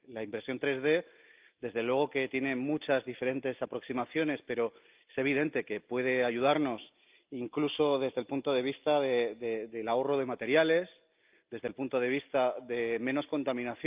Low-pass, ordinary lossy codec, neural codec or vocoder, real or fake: 3.6 kHz; Opus, 24 kbps; none; real